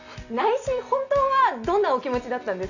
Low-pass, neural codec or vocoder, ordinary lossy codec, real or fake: 7.2 kHz; none; none; real